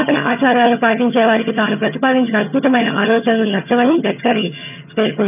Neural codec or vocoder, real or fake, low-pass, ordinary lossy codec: vocoder, 22.05 kHz, 80 mel bands, HiFi-GAN; fake; 3.6 kHz; none